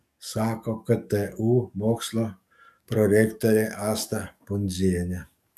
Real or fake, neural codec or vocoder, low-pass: fake; codec, 44.1 kHz, 7.8 kbps, DAC; 14.4 kHz